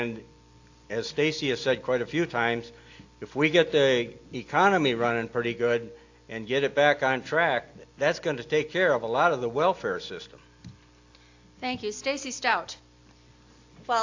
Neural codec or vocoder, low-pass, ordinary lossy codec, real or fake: none; 7.2 kHz; AAC, 48 kbps; real